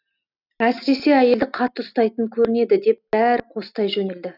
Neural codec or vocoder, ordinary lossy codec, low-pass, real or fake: none; none; 5.4 kHz; real